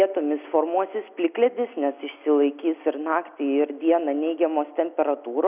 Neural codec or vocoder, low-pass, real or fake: none; 3.6 kHz; real